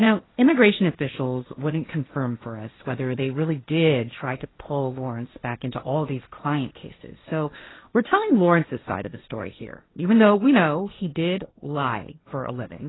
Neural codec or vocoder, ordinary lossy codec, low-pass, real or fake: codec, 16 kHz, 1.1 kbps, Voila-Tokenizer; AAC, 16 kbps; 7.2 kHz; fake